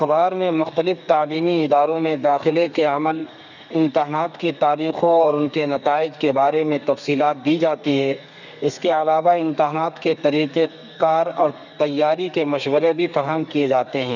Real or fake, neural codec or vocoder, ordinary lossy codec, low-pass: fake; codec, 32 kHz, 1.9 kbps, SNAC; none; 7.2 kHz